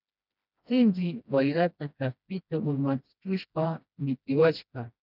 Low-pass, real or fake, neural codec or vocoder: 5.4 kHz; fake; codec, 16 kHz, 1 kbps, FreqCodec, smaller model